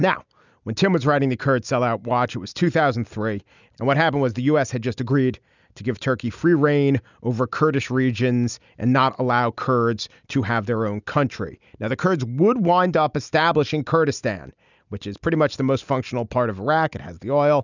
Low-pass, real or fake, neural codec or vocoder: 7.2 kHz; real; none